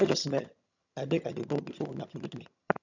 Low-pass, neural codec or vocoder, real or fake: 7.2 kHz; vocoder, 22.05 kHz, 80 mel bands, HiFi-GAN; fake